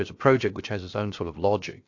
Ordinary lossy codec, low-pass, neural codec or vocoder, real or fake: AAC, 48 kbps; 7.2 kHz; codec, 16 kHz, about 1 kbps, DyCAST, with the encoder's durations; fake